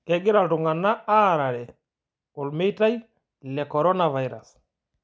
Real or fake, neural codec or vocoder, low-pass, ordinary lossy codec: real; none; none; none